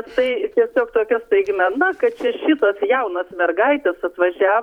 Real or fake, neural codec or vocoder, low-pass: fake; vocoder, 44.1 kHz, 128 mel bands every 512 samples, BigVGAN v2; 19.8 kHz